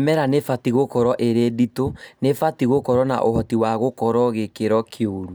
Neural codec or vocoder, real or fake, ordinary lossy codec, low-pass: none; real; none; none